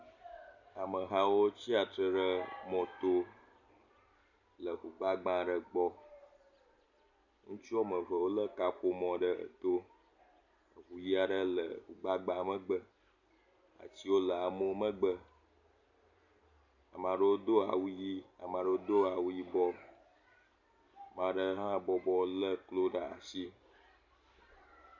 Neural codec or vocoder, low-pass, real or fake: none; 7.2 kHz; real